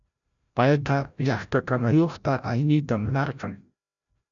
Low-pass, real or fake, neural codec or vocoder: 7.2 kHz; fake; codec, 16 kHz, 0.5 kbps, FreqCodec, larger model